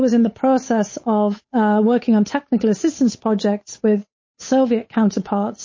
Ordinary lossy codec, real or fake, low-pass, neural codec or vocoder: MP3, 32 kbps; fake; 7.2 kHz; codec, 16 kHz, 8 kbps, FunCodec, trained on Chinese and English, 25 frames a second